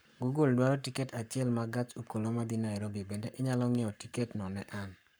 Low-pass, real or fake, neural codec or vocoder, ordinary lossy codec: none; fake; codec, 44.1 kHz, 7.8 kbps, Pupu-Codec; none